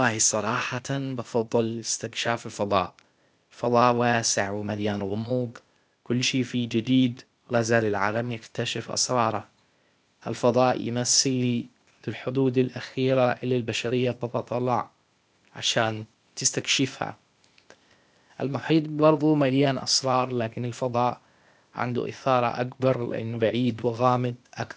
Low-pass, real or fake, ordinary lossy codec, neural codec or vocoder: none; fake; none; codec, 16 kHz, 0.8 kbps, ZipCodec